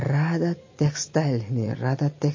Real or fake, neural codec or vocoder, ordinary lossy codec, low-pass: real; none; MP3, 32 kbps; 7.2 kHz